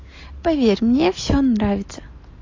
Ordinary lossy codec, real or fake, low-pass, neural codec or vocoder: AAC, 32 kbps; real; 7.2 kHz; none